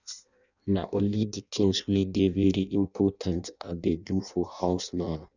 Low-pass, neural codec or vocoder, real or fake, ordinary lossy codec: 7.2 kHz; codec, 16 kHz in and 24 kHz out, 0.6 kbps, FireRedTTS-2 codec; fake; none